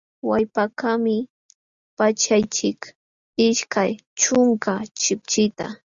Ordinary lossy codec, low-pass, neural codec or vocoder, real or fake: Opus, 64 kbps; 7.2 kHz; none; real